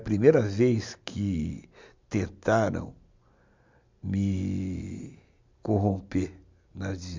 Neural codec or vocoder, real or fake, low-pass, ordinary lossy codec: none; real; 7.2 kHz; MP3, 64 kbps